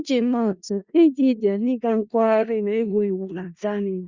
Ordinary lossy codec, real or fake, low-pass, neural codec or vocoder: Opus, 64 kbps; fake; 7.2 kHz; codec, 16 kHz in and 24 kHz out, 0.4 kbps, LongCat-Audio-Codec, four codebook decoder